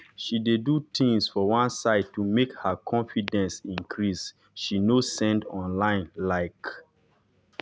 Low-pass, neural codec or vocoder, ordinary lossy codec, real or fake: none; none; none; real